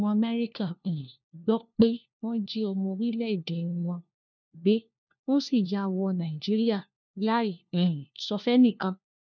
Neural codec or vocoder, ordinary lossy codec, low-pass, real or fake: codec, 16 kHz, 1 kbps, FunCodec, trained on LibriTTS, 50 frames a second; none; 7.2 kHz; fake